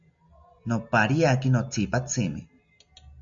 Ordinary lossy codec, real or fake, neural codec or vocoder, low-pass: AAC, 64 kbps; real; none; 7.2 kHz